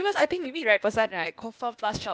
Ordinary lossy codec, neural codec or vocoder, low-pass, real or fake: none; codec, 16 kHz, 0.8 kbps, ZipCodec; none; fake